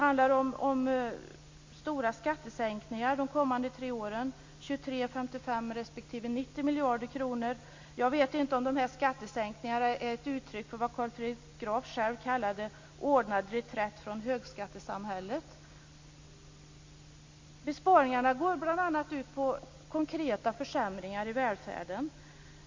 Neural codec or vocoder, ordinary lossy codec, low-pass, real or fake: none; MP3, 48 kbps; 7.2 kHz; real